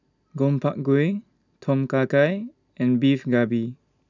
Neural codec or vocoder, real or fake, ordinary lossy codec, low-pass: none; real; none; 7.2 kHz